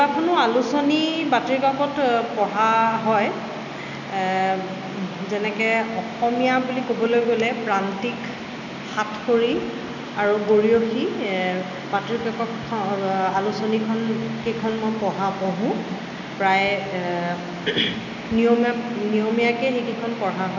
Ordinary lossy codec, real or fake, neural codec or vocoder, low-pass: none; real; none; 7.2 kHz